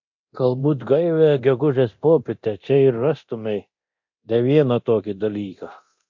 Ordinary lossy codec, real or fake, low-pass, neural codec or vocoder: MP3, 48 kbps; fake; 7.2 kHz; codec, 24 kHz, 0.9 kbps, DualCodec